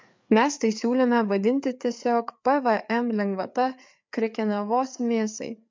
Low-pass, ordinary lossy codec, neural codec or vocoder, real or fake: 7.2 kHz; MP3, 64 kbps; codec, 16 kHz, 4 kbps, FreqCodec, larger model; fake